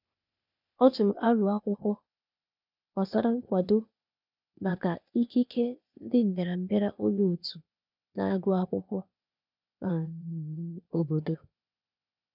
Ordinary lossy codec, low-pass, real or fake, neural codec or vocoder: none; 5.4 kHz; fake; codec, 16 kHz, 0.8 kbps, ZipCodec